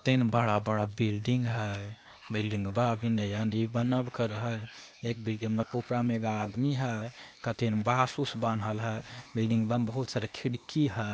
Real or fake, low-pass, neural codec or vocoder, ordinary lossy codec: fake; none; codec, 16 kHz, 0.8 kbps, ZipCodec; none